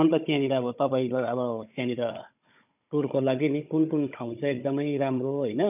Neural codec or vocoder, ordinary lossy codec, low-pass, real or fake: codec, 16 kHz, 16 kbps, FunCodec, trained on Chinese and English, 50 frames a second; none; 3.6 kHz; fake